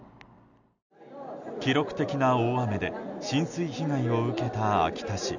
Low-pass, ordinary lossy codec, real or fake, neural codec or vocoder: 7.2 kHz; none; real; none